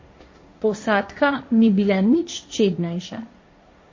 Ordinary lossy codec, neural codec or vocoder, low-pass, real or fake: MP3, 32 kbps; codec, 16 kHz, 1.1 kbps, Voila-Tokenizer; 7.2 kHz; fake